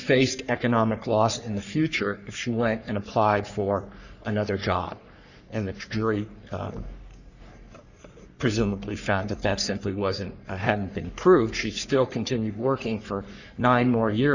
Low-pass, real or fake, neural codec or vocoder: 7.2 kHz; fake; codec, 44.1 kHz, 3.4 kbps, Pupu-Codec